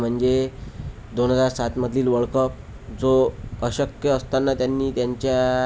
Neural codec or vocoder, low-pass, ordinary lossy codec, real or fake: none; none; none; real